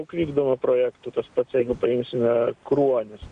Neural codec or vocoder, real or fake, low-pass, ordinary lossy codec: none; real; 9.9 kHz; MP3, 96 kbps